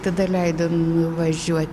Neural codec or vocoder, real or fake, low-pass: none; real; 14.4 kHz